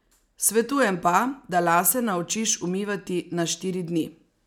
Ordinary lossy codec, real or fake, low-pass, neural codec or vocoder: none; real; 19.8 kHz; none